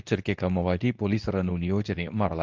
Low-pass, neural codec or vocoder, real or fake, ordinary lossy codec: 7.2 kHz; codec, 24 kHz, 0.9 kbps, WavTokenizer, medium speech release version 2; fake; Opus, 24 kbps